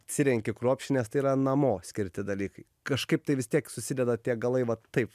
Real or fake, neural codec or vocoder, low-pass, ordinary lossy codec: real; none; 14.4 kHz; MP3, 96 kbps